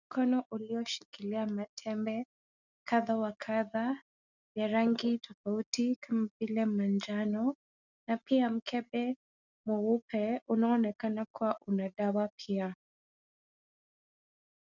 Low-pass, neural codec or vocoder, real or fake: 7.2 kHz; none; real